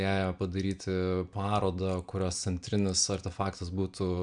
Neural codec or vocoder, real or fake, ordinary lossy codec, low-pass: none; real; MP3, 96 kbps; 9.9 kHz